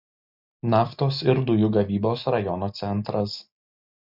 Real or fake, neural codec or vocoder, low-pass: real; none; 5.4 kHz